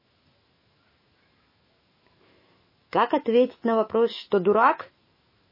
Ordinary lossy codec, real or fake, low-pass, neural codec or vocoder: MP3, 24 kbps; fake; 5.4 kHz; autoencoder, 48 kHz, 128 numbers a frame, DAC-VAE, trained on Japanese speech